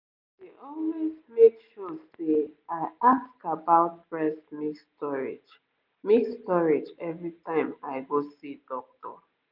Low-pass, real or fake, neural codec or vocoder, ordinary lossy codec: 5.4 kHz; real; none; none